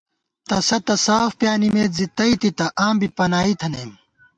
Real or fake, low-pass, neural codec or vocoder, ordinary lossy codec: real; 9.9 kHz; none; MP3, 64 kbps